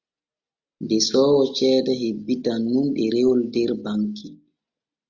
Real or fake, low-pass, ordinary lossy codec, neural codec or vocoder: real; 7.2 kHz; Opus, 64 kbps; none